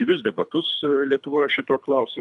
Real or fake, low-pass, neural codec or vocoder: fake; 10.8 kHz; codec, 24 kHz, 3 kbps, HILCodec